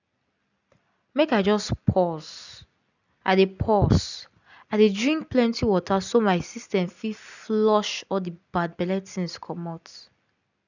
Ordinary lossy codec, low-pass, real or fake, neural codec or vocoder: none; 7.2 kHz; real; none